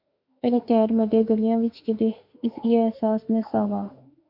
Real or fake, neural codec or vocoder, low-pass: fake; autoencoder, 48 kHz, 32 numbers a frame, DAC-VAE, trained on Japanese speech; 5.4 kHz